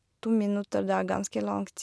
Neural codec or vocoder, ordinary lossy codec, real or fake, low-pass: none; none; real; none